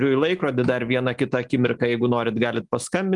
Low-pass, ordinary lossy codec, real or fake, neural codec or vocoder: 10.8 kHz; Opus, 32 kbps; real; none